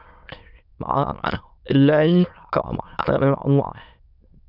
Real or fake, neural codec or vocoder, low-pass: fake; autoencoder, 22.05 kHz, a latent of 192 numbers a frame, VITS, trained on many speakers; 5.4 kHz